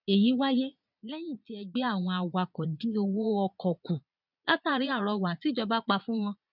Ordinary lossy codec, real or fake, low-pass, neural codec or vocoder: none; fake; 5.4 kHz; vocoder, 22.05 kHz, 80 mel bands, Vocos